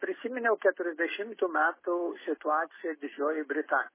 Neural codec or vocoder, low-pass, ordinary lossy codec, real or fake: none; 3.6 kHz; MP3, 16 kbps; real